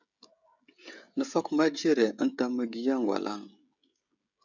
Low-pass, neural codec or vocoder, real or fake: 7.2 kHz; codec, 16 kHz, 16 kbps, FreqCodec, smaller model; fake